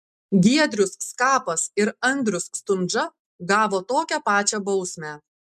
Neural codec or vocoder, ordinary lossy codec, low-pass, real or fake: none; MP3, 96 kbps; 14.4 kHz; real